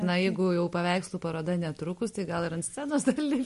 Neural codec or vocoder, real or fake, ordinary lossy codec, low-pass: none; real; MP3, 48 kbps; 14.4 kHz